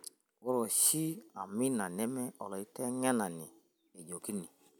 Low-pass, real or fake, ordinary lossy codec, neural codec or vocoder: none; real; none; none